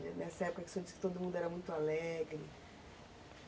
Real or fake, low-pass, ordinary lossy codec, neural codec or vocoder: real; none; none; none